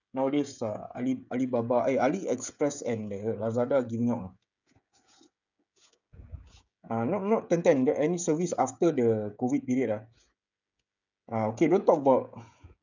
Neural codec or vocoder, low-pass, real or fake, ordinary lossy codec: codec, 16 kHz, 16 kbps, FreqCodec, smaller model; 7.2 kHz; fake; none